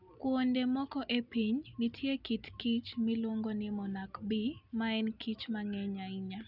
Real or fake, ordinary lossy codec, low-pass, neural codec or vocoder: real; none; 5.4 kHz; none